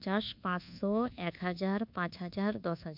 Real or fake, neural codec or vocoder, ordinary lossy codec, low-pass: fake; codec, 24 kHz, 1.2 kbps, DualCodec; MP3, 48 kbps; 5.4 kHz